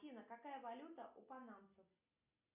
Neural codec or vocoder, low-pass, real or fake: none; 3.6 kHz; real